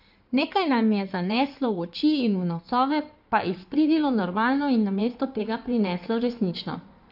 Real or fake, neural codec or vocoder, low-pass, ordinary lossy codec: fake; codec, 16 kHz in and 24 kHz out, 2.2 kbps, FireRedTTS-2 codec; 5.4 kHz; none